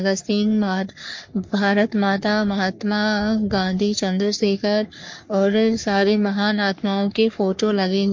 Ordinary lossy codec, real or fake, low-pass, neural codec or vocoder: MP3, 48 kbps; fake; 7.2 kHz; codec, 44.1 kHz, 3.4 kbps, Pupu-Codec